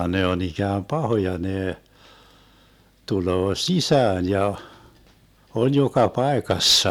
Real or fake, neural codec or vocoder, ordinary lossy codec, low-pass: real; none; none; 19.8 kHz